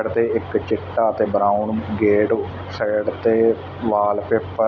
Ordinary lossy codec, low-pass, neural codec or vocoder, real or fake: none; 7.2 kHz; none; real